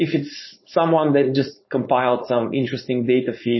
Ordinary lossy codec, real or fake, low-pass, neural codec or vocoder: MP3, 24 kbps; fake; 7.2 kHz; codec, 16 kHz, 4.8 kbps, FACodec